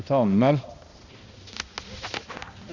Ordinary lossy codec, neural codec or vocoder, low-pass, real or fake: Opus, 64 kbps; codec, 16 kHz, 1 kbps, X-Codec, HuBERT features, trained on balanced general audio; 7.2 kHz; fake